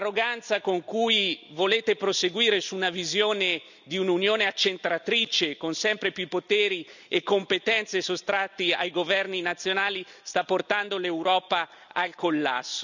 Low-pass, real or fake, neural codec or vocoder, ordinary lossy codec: 7.2 kHz; real; none; none